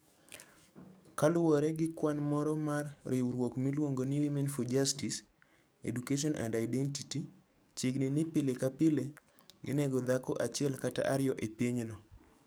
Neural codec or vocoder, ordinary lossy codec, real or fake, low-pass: codec, 44.1 kHz, 7.8 kbps, DAC; none; fake; none